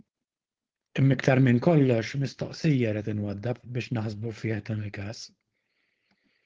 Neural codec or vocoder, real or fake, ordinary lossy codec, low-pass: codec, 16 kHz, 4.8 kbps, FACodec; fake; Opus, 16 kbps; 7.2 kHz